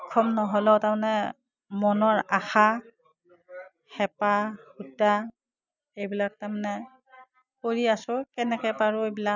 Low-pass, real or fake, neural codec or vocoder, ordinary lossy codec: 7.2 kHz; real; none; none